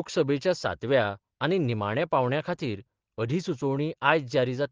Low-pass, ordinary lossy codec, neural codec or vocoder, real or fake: 7.2 kHz; Opus, 16 kbps; none; real